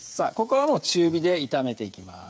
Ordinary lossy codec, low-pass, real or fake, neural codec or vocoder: none; none; fake; codec, 16 kHz, 8 kbps, FreqCodec, smaller model